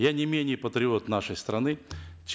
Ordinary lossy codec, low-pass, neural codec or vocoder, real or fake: none; none; none; real